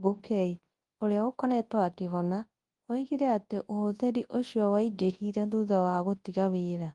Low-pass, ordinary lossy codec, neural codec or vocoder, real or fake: 10.8 kHz; Opus, 24 kbps; codec, 24 kHz, 0.9 kbps, WavTokenizer, large speech release; fake